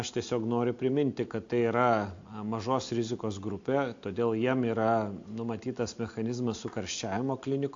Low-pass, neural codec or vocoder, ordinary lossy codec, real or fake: 7.2 kHz; none; AAC, 48 kbps; real